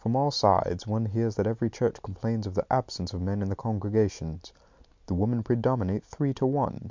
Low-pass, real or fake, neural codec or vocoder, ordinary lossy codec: 7.2 kHz; real; none; MP3, 64 kbps